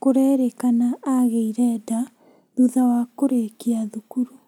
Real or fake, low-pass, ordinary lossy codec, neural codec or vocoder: real; 19.8 kHz; none; none